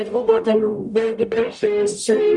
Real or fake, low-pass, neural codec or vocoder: fake; 10.8 kHz; codec, 44.1 kHz, 0.9 kbps, DAC